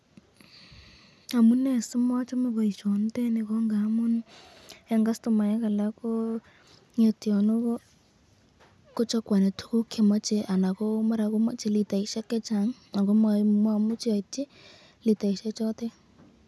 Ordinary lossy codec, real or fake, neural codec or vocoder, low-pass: none; real; none; none